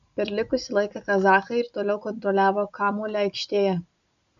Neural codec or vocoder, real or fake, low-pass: none; real; 7.2 kHz